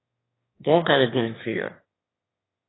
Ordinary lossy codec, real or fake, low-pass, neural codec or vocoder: AAC, 16 kbps; fake; 7.2 kHz; autoencoder, 22.05 kHz, a latent of 192 numbers a frame, VITS, trained on one speaker